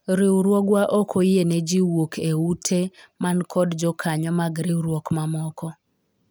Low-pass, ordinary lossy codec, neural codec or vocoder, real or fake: none; none; none; real